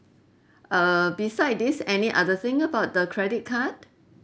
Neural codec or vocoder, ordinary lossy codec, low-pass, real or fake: none; none; none; real